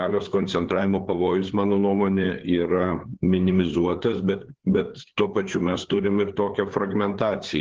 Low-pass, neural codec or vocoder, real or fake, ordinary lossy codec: 7.2 kHz; codec, 16 kHz, 4 kbps, FreqCodec, larger model; fake; Opus, 16 kbps